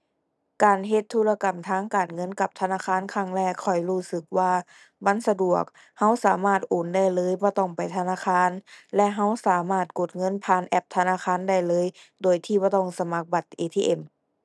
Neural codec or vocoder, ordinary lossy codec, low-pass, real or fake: none; none; none; real